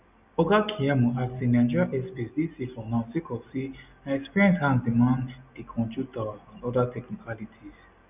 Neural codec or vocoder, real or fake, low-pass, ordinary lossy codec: none; real; 3.6 kHz; none